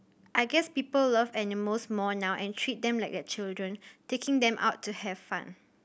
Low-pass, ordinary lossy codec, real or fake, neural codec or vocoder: none; none; real; none